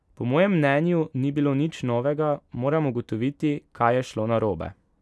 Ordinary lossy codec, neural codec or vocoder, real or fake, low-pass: none; none; real; none